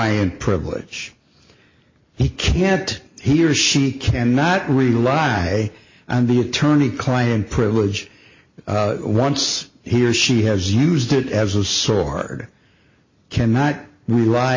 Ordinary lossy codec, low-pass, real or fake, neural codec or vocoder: MP3, 32 kbps; 7.2 kHz; real; none